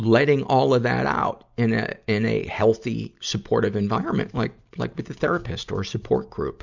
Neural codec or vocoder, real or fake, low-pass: none; real; 7.2 kHz